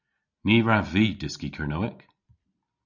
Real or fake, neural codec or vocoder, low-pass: real; none; 7.2 kHz